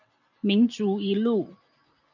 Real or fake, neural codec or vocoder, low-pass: real; none; 7.2 kHz